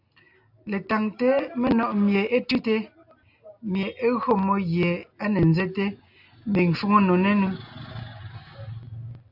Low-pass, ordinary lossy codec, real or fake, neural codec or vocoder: 5.4 kHz; AAC, 48 kbps; real; none